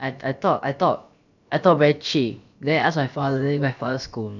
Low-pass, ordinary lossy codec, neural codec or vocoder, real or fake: 7.2 kHz; none; codec, 16 kHz, about 1 kbps, DyCAST, with the encoder's durations; fake